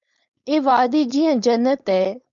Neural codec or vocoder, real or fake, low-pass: codec, 16 kHz, 4.8 kbps, FACodec; fake; 7.2 kHz